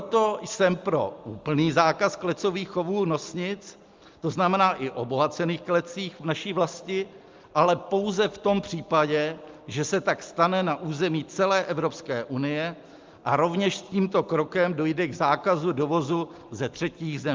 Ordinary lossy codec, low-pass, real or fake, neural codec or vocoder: Opus, 32 kbps; 7.2 kHz; real; none